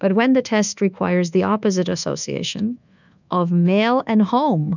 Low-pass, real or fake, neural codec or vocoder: 7.2 kHz; fake; codec, 24 kHz, 1.2 kbps, DualCodec